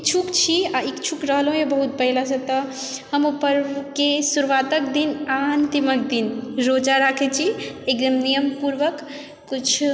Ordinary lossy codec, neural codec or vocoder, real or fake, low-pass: none; none; real; none